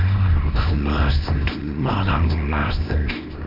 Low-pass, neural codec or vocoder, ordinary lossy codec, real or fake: 5.4 kHz; codec, 24 kHz, 0.9 kbps, WavTokenizer, small release; none; fake